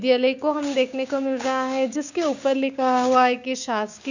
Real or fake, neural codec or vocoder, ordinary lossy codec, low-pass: fake; codec, 16 kHz, 6 kbps, DAC; none; 7.2 kHz